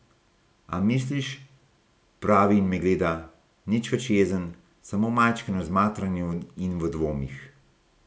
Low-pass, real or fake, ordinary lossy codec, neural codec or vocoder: none; real; none; none